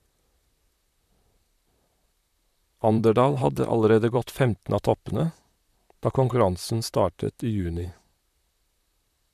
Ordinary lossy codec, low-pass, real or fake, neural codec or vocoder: MP3, 96 kbps; 14.4 kHz; fake; vocoder, 44.1 kHz, 128 mel bands, Pupu-Vocoder